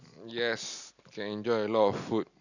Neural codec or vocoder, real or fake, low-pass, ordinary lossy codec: none; real; 7.2 kHz; none